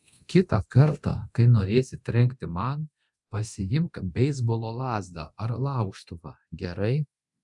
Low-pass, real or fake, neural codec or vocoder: 10.8 kHz; fake; codec, 24 kHz, 0.9 kbps, DualCodec